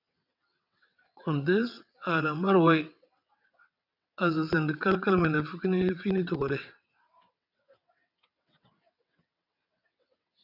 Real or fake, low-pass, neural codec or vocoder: fake; 5.4 kHz; vocoder, 22.05 kHz, 80 mel bands, WaveNeXt